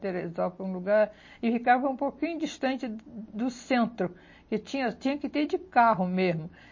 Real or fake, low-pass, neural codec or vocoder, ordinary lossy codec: real; 7.2 kHz; none; MP3, 32 kbps